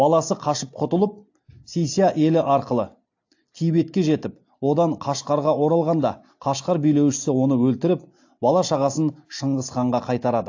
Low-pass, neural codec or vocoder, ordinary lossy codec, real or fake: 7.2 kHz; none; AAC, 48 kbps; real